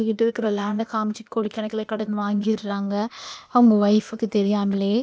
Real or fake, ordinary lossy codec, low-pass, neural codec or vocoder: fake; none; none; codec, 16 kHz, 0.8 kbps, ZipCodec